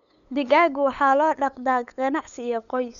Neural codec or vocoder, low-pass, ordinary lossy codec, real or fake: codec, 16 kHz, 8 kbps, FunCodec, trained on LibriTTS, 25 frames a second; 7.2 kHz; none; fake